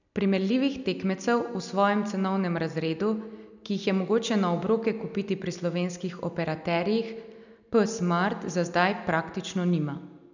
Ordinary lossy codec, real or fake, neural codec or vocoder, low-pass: none; real; none; 7.2 kHz